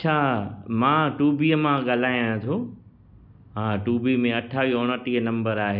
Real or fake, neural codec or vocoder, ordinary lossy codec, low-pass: real; none; none; 5.4 kHz